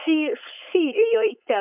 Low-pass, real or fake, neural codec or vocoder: 3.6 kHz; fake; codec, 16 kHz, 4.8 kbps, FACodec